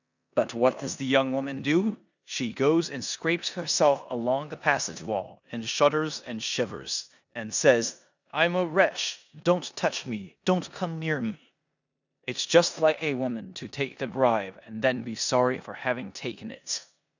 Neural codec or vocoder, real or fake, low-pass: codec, 16 kHz in and 24 kHz out, 0.9 kbps, LongCat-Audio-Codec, four codebook decoder; fake; 7.2 kHz